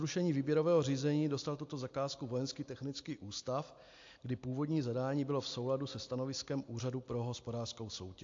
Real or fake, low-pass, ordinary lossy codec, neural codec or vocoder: real; 7.2 kHz; AAC, 48 kbps; none